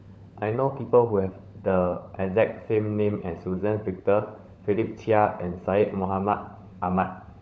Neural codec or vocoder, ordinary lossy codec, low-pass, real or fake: codec, 16 kHz, 16 kbps, FunCodec, trained on LibriTTS, 50 frames a second; none; none; fake